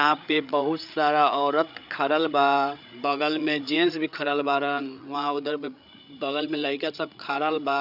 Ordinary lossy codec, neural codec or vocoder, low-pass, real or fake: none; codec, 16 kHz, 8 kbps, FreqCodec, larger model; 5.4 kHz; fake